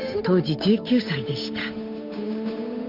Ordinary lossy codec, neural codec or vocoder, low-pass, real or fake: none; codec, 16 kHz, 8 kbps, FunCodec, trained on Chinese and English, 25 frames a second; 5.4 kHz; fake